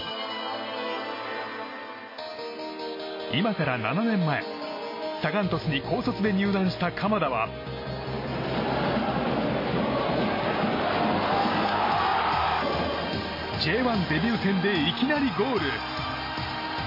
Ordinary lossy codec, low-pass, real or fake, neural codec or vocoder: MP3, 24 kbps; 5.4 kHz; real; none